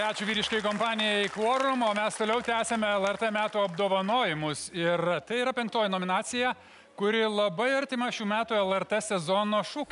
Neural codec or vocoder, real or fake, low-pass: none; real; 10.8 kHz